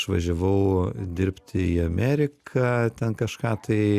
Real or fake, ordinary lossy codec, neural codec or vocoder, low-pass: real; Opus, 64 kbps; none; 14.4 kHz